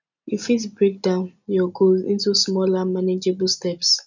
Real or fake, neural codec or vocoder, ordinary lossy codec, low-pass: real; none; none; 7.2 kHz